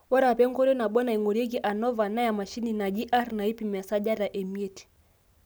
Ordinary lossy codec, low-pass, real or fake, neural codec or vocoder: none; none; real; none